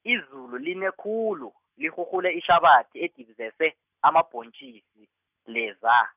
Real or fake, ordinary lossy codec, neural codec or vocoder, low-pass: real; none; none; 3.6 kHz